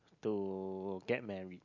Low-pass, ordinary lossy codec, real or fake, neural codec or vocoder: 7.2 kHz; none; real; none